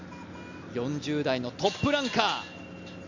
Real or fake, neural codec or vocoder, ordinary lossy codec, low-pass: real; none; Opus, 64 kbps; 7.2 kHz